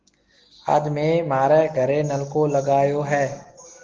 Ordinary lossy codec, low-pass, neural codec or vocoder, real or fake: Opus, 16 kbps; 7.2 kHz; none; real